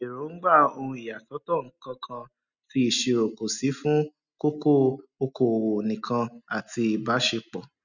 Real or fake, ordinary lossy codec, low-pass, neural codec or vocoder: real; none; 7.2 kHz; none